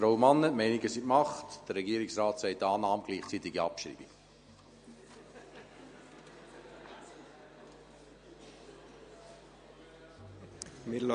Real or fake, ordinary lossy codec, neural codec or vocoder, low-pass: real; MP3, 48 kbps; none; 9.9 kHz